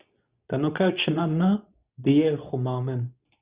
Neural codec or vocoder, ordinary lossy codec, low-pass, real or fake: codec, 24 kHz, 0.9 kbps, WavTokenizer, medium speech release version 2; Opus, 64 kbps; 3.6 kHz; fake